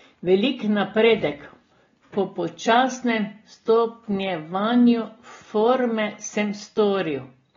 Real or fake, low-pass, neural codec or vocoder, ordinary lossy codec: real; 7.2 kHz; none; AAC, 24 kbps